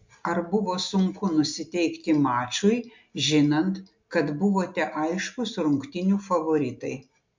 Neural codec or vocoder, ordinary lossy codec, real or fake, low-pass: none; MP3, 64 kbps; real; 7.2 kHz